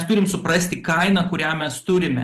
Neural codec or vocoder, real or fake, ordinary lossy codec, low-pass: vocoder, 44.1 kHz, 128 mel bands every 512 samples, BigVGAN v2; fake; Opus, 24 kbps; 14.4 kHz